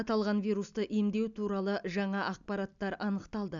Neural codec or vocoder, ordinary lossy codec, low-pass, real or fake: none; none; 7.2 kHz; real